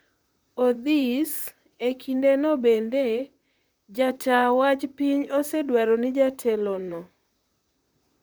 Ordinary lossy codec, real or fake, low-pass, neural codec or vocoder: none; fake; none; vocoder, 44.1 kHz, 128 mel bands, Pupu-Vocoder